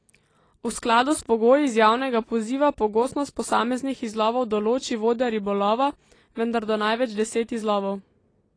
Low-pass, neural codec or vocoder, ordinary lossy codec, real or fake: 9.9 kHz; none; AAC, 32 kbps; real